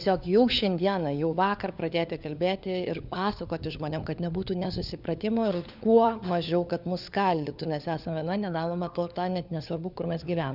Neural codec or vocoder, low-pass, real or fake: codec, 16 kHz, 4 kbps, FunCodec, trained on LibriTTS, 50 frames a second; 5.4 kHz; fake